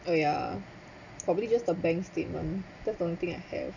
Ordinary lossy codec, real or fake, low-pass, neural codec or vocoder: none; real; 7.2 kHz; none